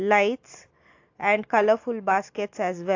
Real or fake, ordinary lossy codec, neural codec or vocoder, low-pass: real; AAC, 48 kbps; none; 7.2 kHz